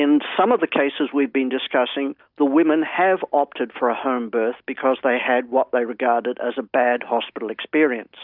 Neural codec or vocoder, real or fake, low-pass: none; real; 5.4 kHz